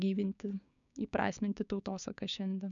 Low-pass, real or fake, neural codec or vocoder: 7.2 kHz; fake; codec, 16 kHz, 6 kbps, DAC